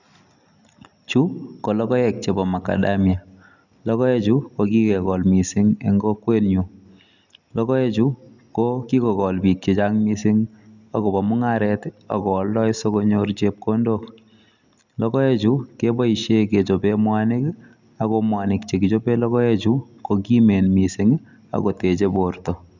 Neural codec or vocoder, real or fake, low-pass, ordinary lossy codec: none; real; 7.2 kHz; none